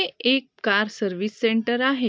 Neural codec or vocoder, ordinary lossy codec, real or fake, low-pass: none; none; real; none